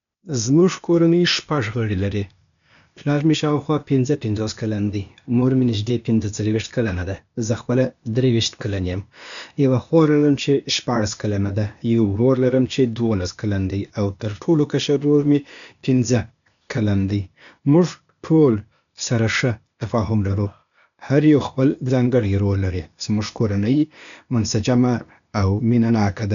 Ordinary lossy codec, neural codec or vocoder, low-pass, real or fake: none; codec, 16 kHz, 0.8 kbps, ZipCodec; 7.2 kHz; fake